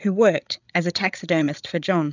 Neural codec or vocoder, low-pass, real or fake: codec, 16 kHz, 8 kbps, FreqCodec, larger model; 7.2 kHz; fake